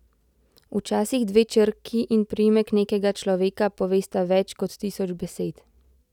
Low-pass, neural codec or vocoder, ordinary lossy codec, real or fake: 19.8 kHz; none; none; real